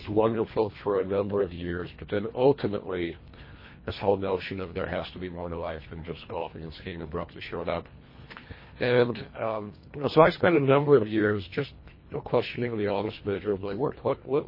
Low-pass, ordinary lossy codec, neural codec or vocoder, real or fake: 5.4 kHz; MP3, 24 kbps; codec, 24 kHz, 1.5 kbps, HILCodec; fake